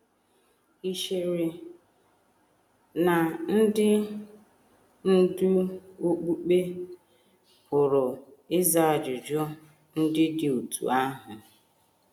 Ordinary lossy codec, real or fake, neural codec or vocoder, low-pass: none; real; none; 19.8 kHz